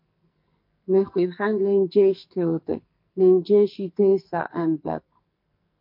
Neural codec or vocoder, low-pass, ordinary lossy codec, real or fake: codec, 44.1 kHz, 2.6 kbps, SNAC; 5.4 kHz; MP3, 32 kbps; fake